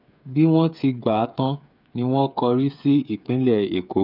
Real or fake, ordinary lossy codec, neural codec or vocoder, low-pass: fake; none; codec, 16 kHz, 8 kbps, FreqCodec, smaller model; 5.4 kHz